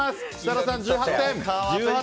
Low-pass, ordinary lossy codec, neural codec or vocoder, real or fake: none; none; none; real